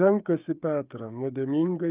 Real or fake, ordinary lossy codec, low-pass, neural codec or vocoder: fake; Opus, 24 kbps; 3.6 kHz; codec, 16 kHz, 16 kbps, FreqCodec, smaller model